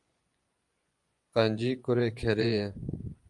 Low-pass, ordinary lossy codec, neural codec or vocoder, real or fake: 10.8 kHz; Opus, 32 kbps; vocoder, 24 kHz, 100 mel bands, Vocos; fake